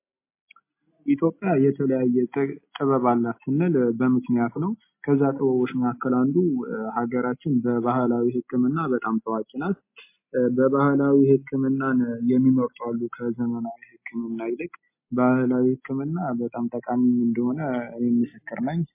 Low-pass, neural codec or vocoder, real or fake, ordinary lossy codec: 3.6 kHz; none; real; MP3, 24 kbps